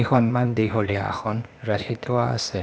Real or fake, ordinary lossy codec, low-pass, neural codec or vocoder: fake; none; none; codec, 16 kHz, 0.8 kbps, ZipCodec